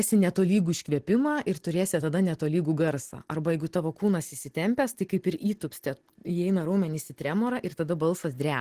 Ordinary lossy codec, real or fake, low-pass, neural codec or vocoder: Opus, 16 kbps; real; 14.4 kHz; none